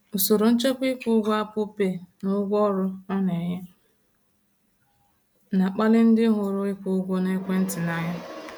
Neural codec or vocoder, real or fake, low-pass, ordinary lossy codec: none; real; none; none